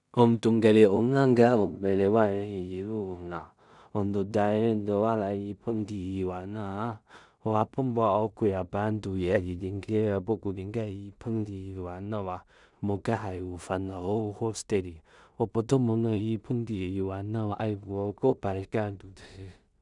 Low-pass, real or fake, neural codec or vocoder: 10.8 kHz; fake; codec, 16 kHz in and 24 kHz out, 0.4 kbps, LongCat-Audio-Codec, two codebook decoder